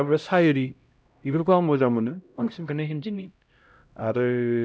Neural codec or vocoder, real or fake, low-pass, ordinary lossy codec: codec, 16 kHz, 0.5 kbps, X-Codec, HuBERT features, trained on LibriSpeech; fake; none; none